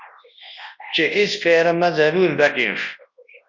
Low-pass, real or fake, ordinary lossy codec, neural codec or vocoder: 7.2 kHz; fake; MP3, 48 kbps; codec, 24 kHz, 0.9 kbps, WavTokenizer, large speech release